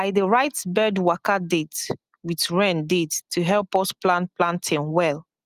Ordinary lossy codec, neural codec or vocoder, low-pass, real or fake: Opus, 32 kbps; none; 14.4 kHz; real